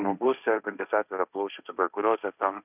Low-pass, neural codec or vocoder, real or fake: 3.6 kHz; codec, 16 kHz, 1.1 kbps, Voila-Tokenizer; fake